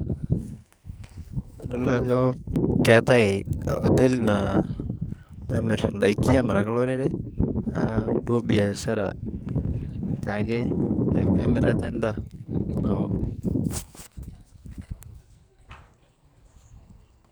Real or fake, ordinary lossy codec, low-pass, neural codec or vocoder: fake; none; none; codec, 44.1 kHz, 2.6 kbps, SNAC